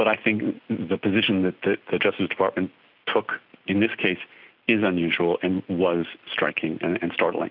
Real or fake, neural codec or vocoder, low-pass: real; none; 5.4 kHz